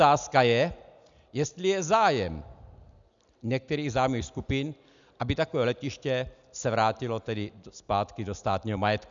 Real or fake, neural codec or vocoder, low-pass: real; none; 7.2 kHz